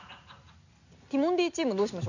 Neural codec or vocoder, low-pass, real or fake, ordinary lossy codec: none; 7.2 kHz; real; none